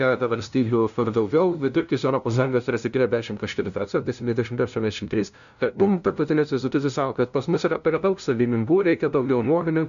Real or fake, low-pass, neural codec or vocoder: fake; 7.2 kHz; codec, 16 kHz, 0.5 kbps, FunCodec, trained on LibriTTS, 25 frames a second